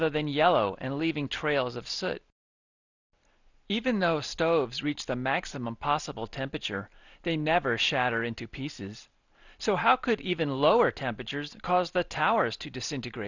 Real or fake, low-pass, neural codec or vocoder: real; 7.2 kHz; none